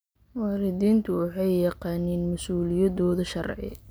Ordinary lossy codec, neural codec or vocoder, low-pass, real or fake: none; none; none; real